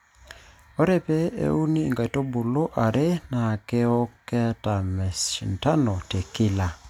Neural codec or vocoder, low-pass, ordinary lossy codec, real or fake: none; 19.8 kHz; none; real